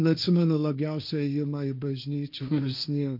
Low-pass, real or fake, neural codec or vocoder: 5.4 kHz; fake; codec, 16 kHz, 1.1 kbps, Voila-Tokenizer